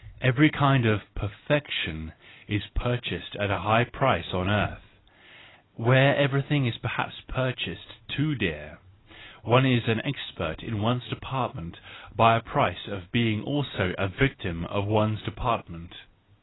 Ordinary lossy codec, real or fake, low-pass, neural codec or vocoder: AAC, 16 kbps; real; 7.2 kHz; none